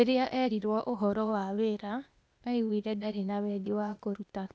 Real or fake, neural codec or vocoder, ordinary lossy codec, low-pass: fake; codec, 16 kHz, 0.8 kbps, ZipCodec; none; none